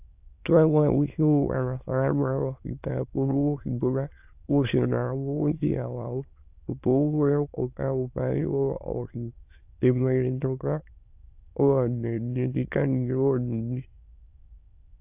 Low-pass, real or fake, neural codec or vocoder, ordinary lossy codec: 3.6 kHz; fake; autoencoder, 22.05 kHz, a latent of 192 numbers a frame, VITS, trained on many speakers; AAC, 32 kbps